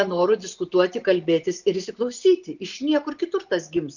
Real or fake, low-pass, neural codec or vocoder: real; 7.2 kHz; none